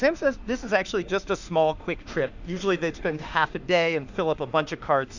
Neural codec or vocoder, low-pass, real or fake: autoencoder, 48 kHz, 32 numbers a frame, DAC-VAE, trained on Japanese speech; 7.2 kHz; fake